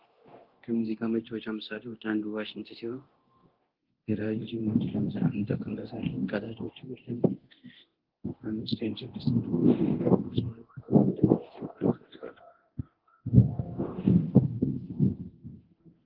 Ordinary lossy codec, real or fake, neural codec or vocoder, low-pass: Opus, 16 kbps; fake; codec, 24 kHz, 0.9 kbps, DualCodec; 5.4 kHz